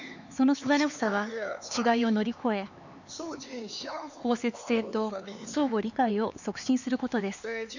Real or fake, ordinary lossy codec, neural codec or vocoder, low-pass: fake; none; codec, 16 kHz, 4 kbps, X-Codec, HuBERT features, trained on LibriSpeech; 7.2 kHz